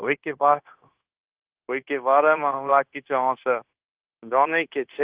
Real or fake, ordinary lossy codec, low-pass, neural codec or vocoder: fake; Opus, 16 kbps; 3.6 kHz; codec, 16 kHz, 0.9 kbps, LongCat-Audio-Codec